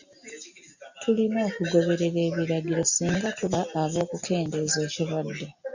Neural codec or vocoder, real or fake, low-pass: none; real; 7.2 kHz